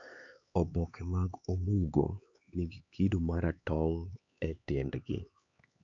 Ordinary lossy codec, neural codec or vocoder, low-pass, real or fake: AAC, 64 kbps; codec, 16 kHz, 4 kbps, X-Codec, HuBERT features, trained on LibriSpeech; 7.2 kHz; fake